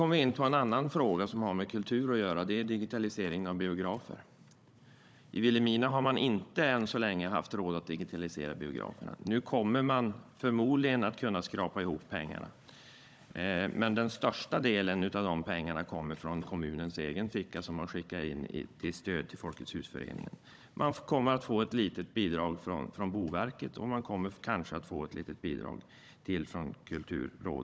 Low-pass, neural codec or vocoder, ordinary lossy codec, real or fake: none; codec, 16 kHz, 16 kbps, FunCodec, trained on Chinese and English, 50 frames a second; none; fake